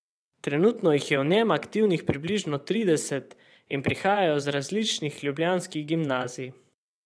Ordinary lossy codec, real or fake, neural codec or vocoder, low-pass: none; fake; vocoder, 22.05 kHz, 80 mel bands, WaveNeXt; none